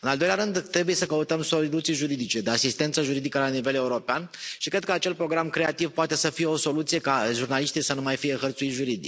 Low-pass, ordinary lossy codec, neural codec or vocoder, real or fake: none; none; none; real